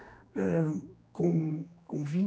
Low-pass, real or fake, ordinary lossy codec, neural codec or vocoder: none; fake; none; codec, 16 kHz, 2 kbps, X-Codec, HuBERT features, trained on general audio